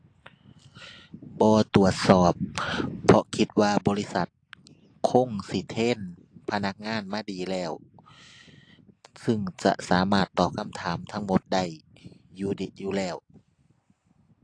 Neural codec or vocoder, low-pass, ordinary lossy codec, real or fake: none; 9.9 kHz; AAC, 48 kbps; real